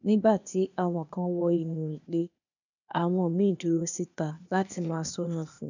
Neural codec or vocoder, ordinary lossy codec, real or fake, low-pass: codec, 16 kHz, 0.8 kbps, ZipCodec; none; fake; 7.2 kHz